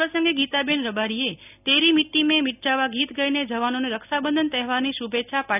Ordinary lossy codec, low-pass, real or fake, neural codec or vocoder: none; 3.6 kHz; real; none